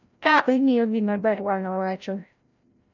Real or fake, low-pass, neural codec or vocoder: fake; 7.2 kHz; codec, 16 kHz, 0.5 kbps, FreqCodec, larger model